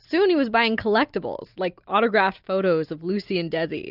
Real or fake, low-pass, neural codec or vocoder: real; 5.4 kHz; none